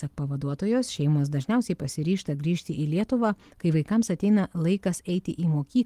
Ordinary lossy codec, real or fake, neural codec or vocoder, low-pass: Opus, 24 kbps; real; none; 14.4 kHz